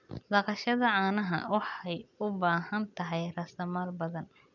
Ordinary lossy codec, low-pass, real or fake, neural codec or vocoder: none; 7.2 kHz; real; none